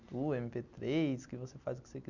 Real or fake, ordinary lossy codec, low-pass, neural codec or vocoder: real; none; 7.2 kHz; none